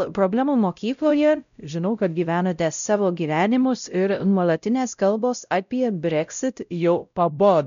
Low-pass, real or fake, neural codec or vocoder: 7.2 kHz; fake; codec, 16 kHz, 0.5 kbps, X-Codec, WavLM features, trained on Multilingual LibriSpeech